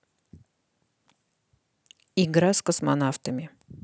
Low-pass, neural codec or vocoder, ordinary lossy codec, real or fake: none; none; none; real